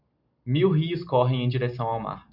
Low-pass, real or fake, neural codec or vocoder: 5.4 kHz; real; none